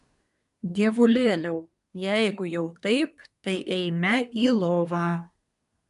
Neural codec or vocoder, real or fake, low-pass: codec, 24 kHz, 1 kbps, SNAC; fake; 10.8 kHz